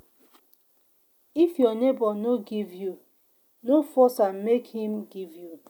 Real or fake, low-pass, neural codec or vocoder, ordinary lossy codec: real; none; none; none